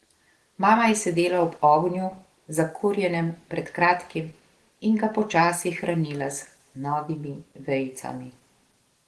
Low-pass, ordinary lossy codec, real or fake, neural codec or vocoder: 10.8 kHz; Opus, 16 kbps; real; none